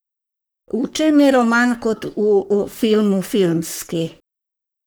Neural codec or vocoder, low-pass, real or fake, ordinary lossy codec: codec, 44.1 kHz, 3.4 kbps, Pupu-Codec; none; fake; none